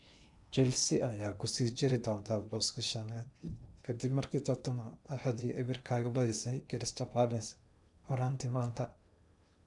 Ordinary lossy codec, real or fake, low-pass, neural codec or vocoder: none; fake; 10.8 kHz; codec, 16 kHz in and 24 kHz out, 0.8 kbps, FocalCodec, streaming, 65536 codes